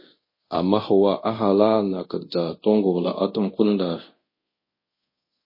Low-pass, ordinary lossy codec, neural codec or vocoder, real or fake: 5.4 kHz; MP3, 24 kbps; codec, 24 kHz, 0.5 kbps, DualCodec; fake